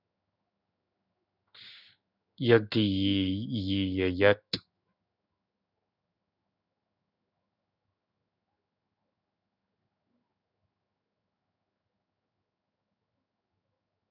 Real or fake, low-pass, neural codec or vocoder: fake; 5.4 kHz; codec, 16 kHz in and 24 kHz out, 1 kbps, XY-Tokenizer